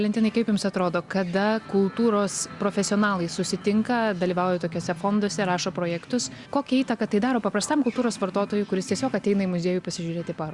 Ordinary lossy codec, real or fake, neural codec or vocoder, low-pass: Opus, 64 kbps; real; none; 10.8 kHz